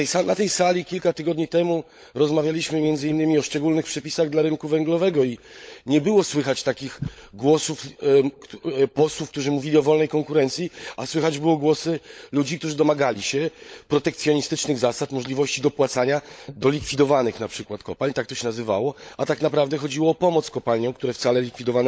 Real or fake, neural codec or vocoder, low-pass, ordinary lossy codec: fake; codec, 16 kHz, 16 kbps, FunCodec, trained on LibriTTS, 50 frames a second; none; none